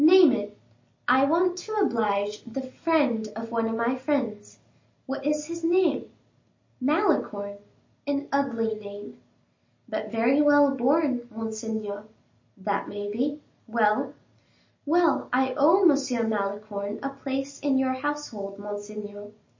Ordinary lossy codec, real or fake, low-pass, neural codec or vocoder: MP3, 32 kbps; real; 7.2 kHz; none